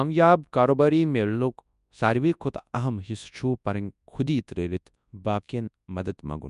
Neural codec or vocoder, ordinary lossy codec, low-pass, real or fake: codec, 24 kHz, 0.9 kbps, WavTokenizer, large speech release; none; 10.8 kHz; fake